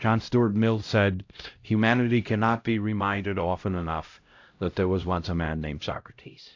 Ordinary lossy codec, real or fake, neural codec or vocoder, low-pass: AAC, 48 kbps; fake; codec, 16 kHz, 0.5 kbps, X-Codec, HuBERT features, trained on LibriSpeech; 7.2 kHz